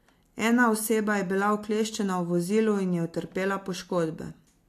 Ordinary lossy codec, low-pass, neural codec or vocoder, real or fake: AAC, 64 kbps; 14.4 kHz; none; real